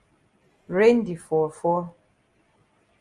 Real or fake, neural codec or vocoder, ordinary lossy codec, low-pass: real; none; Opus, 32 kbps; 10.8 kHz